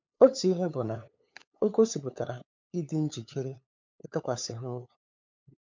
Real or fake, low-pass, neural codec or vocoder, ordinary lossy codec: fake; 7.2 kHz; codec, 16 kHz, 8 kbps, FunCodec, trained on LibriTTS, 25 frames a second; MP3, 64 kbps